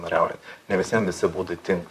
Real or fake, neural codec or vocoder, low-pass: fake; vocoder, 44.1 kHz, 128 mel bands, Pupu-Vocoder; 14.4 kHz